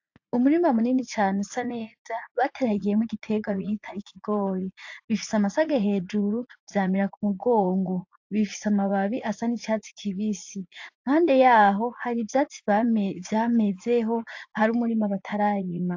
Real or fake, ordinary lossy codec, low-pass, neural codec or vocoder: fake; AAC, 48 kbps; 7.2 kHz; vocoder, 44.1 kHz, 80 mel bands, Vocos